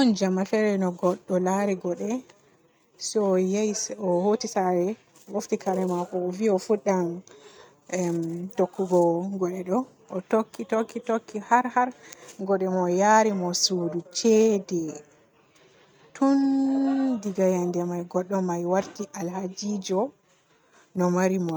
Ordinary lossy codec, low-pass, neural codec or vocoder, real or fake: none; none; none; real